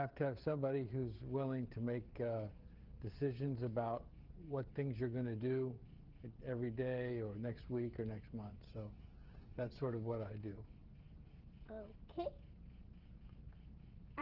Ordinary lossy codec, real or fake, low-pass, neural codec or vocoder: Opus, 16 kbps; fake; 5.4 kHz; codec, 16 kHz, 16 kbps, FreqCodec, smaller model